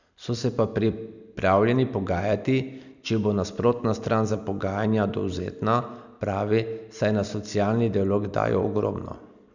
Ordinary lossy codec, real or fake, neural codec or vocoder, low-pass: none; real; none; 7.2 kHz